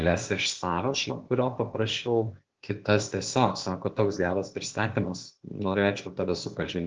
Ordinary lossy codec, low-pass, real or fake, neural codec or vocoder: Opus, 16 kbps; 7.2 kHz; fake; codec, 16 kHz, 0.8 kbps, ZipCodec